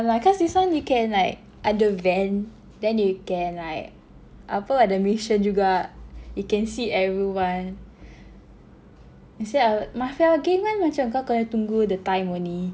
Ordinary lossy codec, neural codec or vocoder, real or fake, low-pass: none; none; real; none